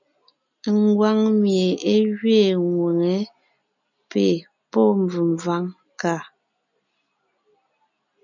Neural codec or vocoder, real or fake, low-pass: none; real; 7.2 kHz